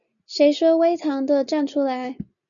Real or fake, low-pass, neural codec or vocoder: real; 7.2 kHz; none